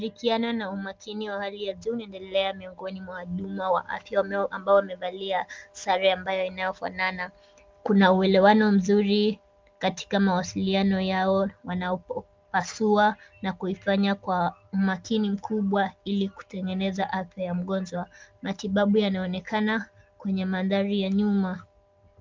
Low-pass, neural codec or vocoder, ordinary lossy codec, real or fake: 7.2 kHz; none; Opus, 32 kbps; real